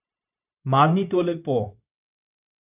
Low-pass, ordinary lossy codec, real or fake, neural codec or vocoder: 3.6 kHz; none; fake; codec, 16 kHz, 0.9 kbps, LongCat-Audio-Codec